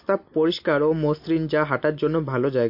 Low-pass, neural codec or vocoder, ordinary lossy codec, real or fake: 5.4 kHz; none; MP3, 32 kbps; real